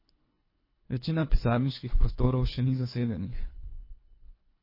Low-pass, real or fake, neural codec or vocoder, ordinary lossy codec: 5.4 kHz; fake; codec, 24 kHz, 3 kbps, HILCodec; MP3, 24 kbps